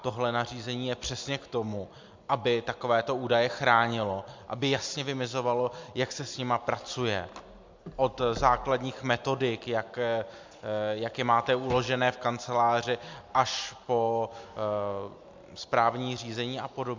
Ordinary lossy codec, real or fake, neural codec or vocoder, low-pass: MP3, 64 kbps; real; none; 7.2 kHz